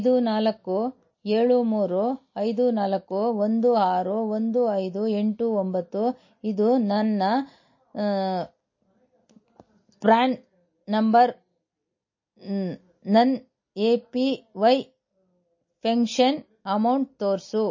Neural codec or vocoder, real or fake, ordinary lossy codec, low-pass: none; real; MP3, 32 kbps; 7.2 kHz